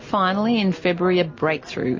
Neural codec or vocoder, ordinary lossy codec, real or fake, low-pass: none; MP3, 32 kbps; real; 7.2 kHz